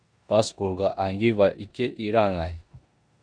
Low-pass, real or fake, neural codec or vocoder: 9.9 kHz; fake; codec, 16 kHz in and 24 kHz out, 0.9 kbps, LongCat-Audio-Codec, four codebook decoder